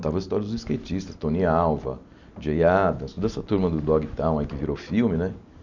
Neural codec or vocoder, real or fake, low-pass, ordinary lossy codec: none; real; 7.2 kHz; none